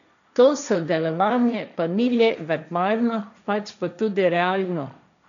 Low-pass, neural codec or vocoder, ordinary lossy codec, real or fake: 7.2 kHz; codec, 16 kHz, 1.1 kbps, Voila-Tokenizer; none; fake